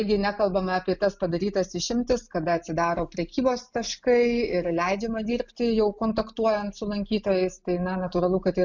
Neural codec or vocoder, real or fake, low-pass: none; real; 7.2 kHz